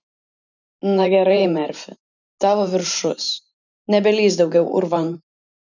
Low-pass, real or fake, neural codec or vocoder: 7.2 kHz; fake; vocoder, 44.1 kHz, 128 mel bands every 512 samples, BigVGAN v2